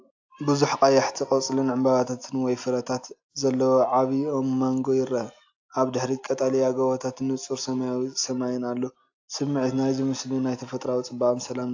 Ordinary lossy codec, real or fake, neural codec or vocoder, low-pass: AAC, 48 kbps; real; none; 7.2 kHz